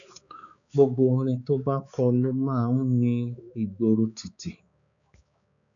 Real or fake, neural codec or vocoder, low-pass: fake; codec, 16 kHz, 4 kbps, X-Codec, HuBERT features, trained on balanced general audio; 7.2 kHz